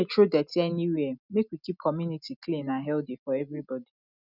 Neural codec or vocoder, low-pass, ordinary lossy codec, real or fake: none; 5.4 kHz; none; real